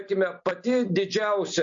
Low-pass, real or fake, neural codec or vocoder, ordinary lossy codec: 7.2 kHz; real; none; MP3, 48 kbps